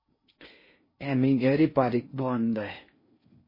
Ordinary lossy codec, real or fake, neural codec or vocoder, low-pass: MP3, 24 kbps; fake; codec, 16 kHz in and 24 kHz out, 0.6 kbps, FocalCodec, streaming, 4096 codes; 5.4 kHz